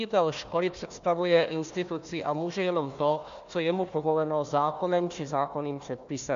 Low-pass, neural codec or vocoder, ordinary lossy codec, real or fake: 7.2 kHz; codec, 16 kHz, 1 kbps, FunCodec, trained on Chinese and English, 50 frames a second; MP3, 64 kbps; fake